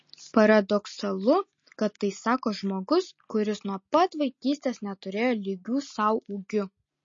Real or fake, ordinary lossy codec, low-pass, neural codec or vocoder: real; MP3, 32 kbps; 7.2 kHz; none